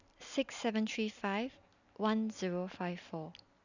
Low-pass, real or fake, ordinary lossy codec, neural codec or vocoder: 7.2 kHz; real; none; none